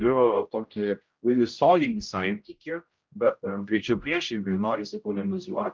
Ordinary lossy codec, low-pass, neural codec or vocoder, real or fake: Opus, 24 kbps; 7.2 kHz; codec, 16 kHz, 0.5 kbps, X-Codec, HuBERT features, trained on general audio; fake